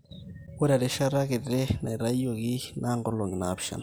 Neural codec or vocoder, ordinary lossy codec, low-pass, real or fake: none; none; none; real